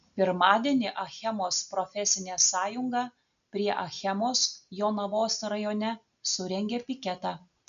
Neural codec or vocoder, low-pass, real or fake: none; 7.2 kHz; real